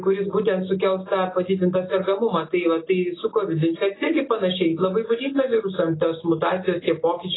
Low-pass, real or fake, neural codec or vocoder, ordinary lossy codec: 7.2 kHz; real; none; AAC, 16 kbps